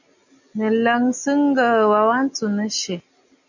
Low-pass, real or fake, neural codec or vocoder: 7.2 kHz; real; none